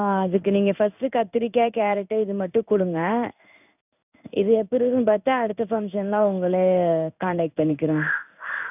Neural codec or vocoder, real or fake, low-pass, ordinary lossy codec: codec, 16 kHz in and 24 kHz out, 1 kbps, XY-Tokenizer; fake; 3.6 kHz; none